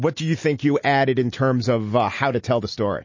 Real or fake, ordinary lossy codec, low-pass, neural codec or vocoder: real; MP3, 32 kbps; 7.2 kHz; none